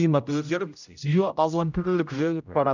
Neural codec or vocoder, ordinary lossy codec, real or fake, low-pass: codec, 16 kHz, 0.5 kbps, X-Codec, HuBERT features, trained on general audio; none; fake; 7.2 kHz